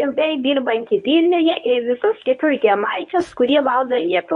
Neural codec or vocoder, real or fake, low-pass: codec, 24 kHz, 0.9 kbps, WavTokenizer, medium speech release version 1; fake; 10.8 kHz